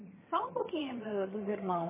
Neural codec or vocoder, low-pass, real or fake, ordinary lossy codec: vocoder, 22.05 kHz, 80 mel bands, HiFi-GAN; 3.6 kHz; fake; MP3, 16 kbps